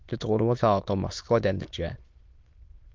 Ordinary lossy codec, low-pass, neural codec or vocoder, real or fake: Opus, 24 kbps; 7.2 kHz; autoencoder, 22.05 kHz, a latent of 192 numbers a frame, VITS, trained on many speakers; fake